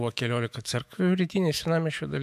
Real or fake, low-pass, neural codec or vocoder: fake; 14.4 kHz; autoencoder, 48 kHz, 128 numbers a frame, DAC-VAE, trained on Japanese speech